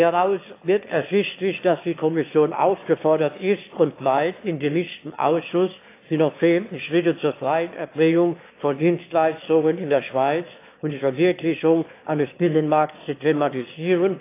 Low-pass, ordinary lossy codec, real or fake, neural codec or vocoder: 3.6 kHz; AAC, 24 kbps; fake; autoencoder, 22.05 kHz, a latent of 192 numbers a frame, VITS, trained on one speaker